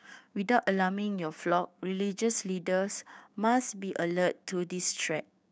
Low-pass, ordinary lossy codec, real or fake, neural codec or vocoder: none; none; fake; codec, 16 kHz, 6 kbps, DAC